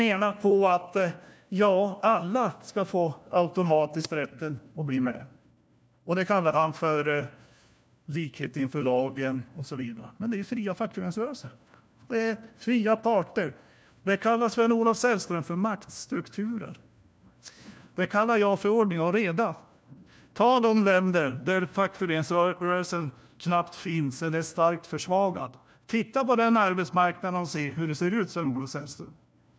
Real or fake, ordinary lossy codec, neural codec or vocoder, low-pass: fake; none; codec, 16 kHz, 1 kbps, FunCodec, trained on LibriTTS, 50 frames a second; none